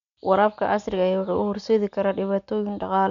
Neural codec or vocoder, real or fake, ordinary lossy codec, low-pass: none; real; none; 7.2 kHz